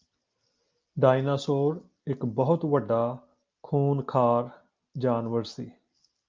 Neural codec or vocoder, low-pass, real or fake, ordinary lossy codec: none; 7.2 kHz; real; Opus, 24 kbps